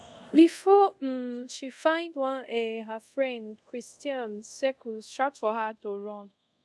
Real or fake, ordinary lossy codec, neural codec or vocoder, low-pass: fake; none; codec, 24 kHz, 0.5 kbps, DualCodec; none